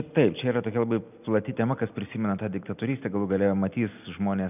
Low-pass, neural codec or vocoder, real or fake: 3.6 kHz; none; real